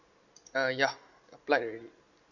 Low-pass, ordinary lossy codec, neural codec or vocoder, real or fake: 7.2 kHz; none; none; real